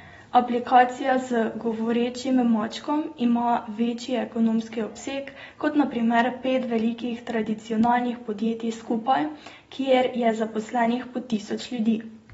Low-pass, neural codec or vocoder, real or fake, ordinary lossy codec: 19.8 kHz; none; real; AAC, 24 kbps